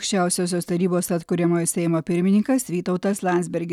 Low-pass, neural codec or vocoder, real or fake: 19.8 kHz; none; real